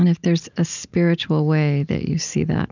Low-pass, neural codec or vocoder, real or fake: 7.2 kHz; none; real